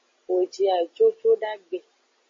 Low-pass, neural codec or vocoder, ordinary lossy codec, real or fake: 7.2 kHz; none; MP3, 32 kbps; real